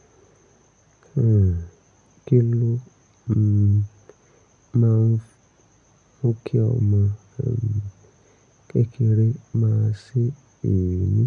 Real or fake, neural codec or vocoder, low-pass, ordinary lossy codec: real; none; 9.9 kHz; none